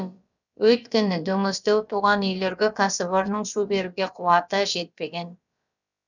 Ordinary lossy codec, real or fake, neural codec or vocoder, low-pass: none; fake; codec, 16 kHz, about 1 kbps, DyCAST, with the encoder's durations; 7.2 kHz